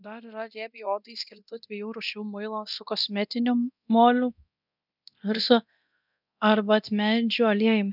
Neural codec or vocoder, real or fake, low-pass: codec, 24 kHz, 0.9 kbps, DualCodec; fake; 5.4 kHz